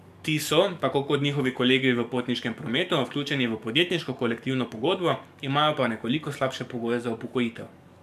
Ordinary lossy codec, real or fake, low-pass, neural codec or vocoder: MP3, 96 kbps; fake; 14.4 kHz; codec, 44.1 kHz, 7.8 kbps, DAC